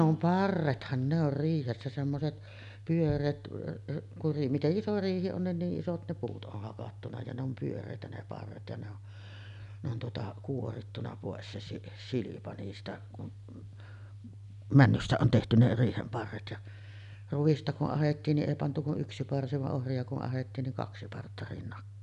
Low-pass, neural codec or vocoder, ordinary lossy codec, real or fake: 10.8 kHz; none; none; real